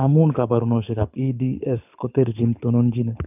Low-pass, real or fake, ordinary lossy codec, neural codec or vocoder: 3.6 kHz; fake; none; codec, 24 kHz, 6 kbps, HILCodec